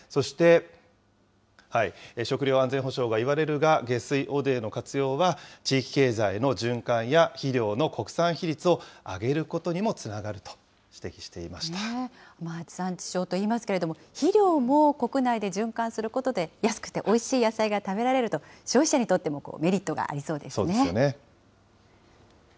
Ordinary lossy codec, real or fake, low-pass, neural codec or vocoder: none; real; none; none